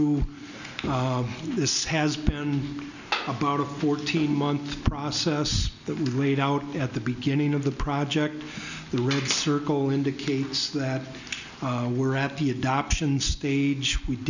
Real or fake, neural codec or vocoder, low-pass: real; none; 7.2 kHz